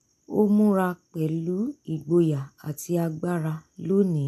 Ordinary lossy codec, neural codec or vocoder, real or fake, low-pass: none; none; real; 14.4 kHz